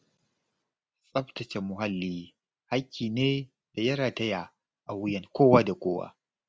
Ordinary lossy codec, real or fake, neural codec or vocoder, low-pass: none; real; none; none